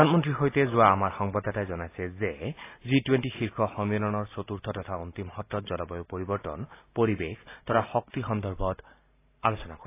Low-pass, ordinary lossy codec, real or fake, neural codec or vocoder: 3.6 kHz; AAC, 24 kbps; real; none